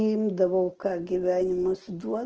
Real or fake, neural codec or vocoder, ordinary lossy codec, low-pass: real; none; Opus, 16 kbps; 7.2 kHz